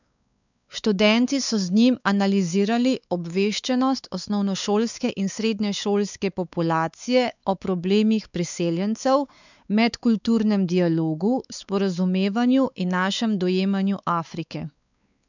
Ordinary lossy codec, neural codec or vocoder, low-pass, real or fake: none; codec, 16 kHz, 4 kbps, X-Codec, WavLM features, trained on Multilingual LibriSpeech; 7.2 kHz; fake